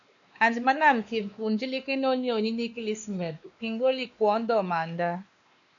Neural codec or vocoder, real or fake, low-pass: codec, 16 kHz, 2 kbps, X-Codec, WavLM features, trained on Multilingual LibriSpeech; fake; 7.2 kHz